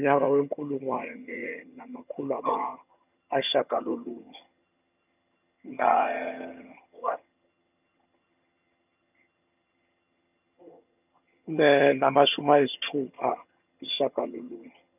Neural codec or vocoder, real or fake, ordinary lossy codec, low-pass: vocoder, 22.05 kHz, 80 mel bands, HiFi-GAN; fake; none; 3.6 kHz